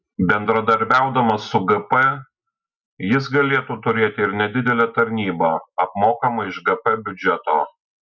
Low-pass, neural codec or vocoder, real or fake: 7.2 kHz; none; real